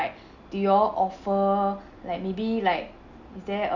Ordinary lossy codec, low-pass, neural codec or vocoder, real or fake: none; 7.2 kHz; none; real